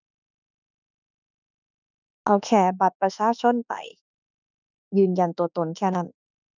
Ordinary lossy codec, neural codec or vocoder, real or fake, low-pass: none; autoencoder, 48 kHz, 32 numbers a frame, DAC-VAE, trained on Japanese speech; fake; 7.2 kHz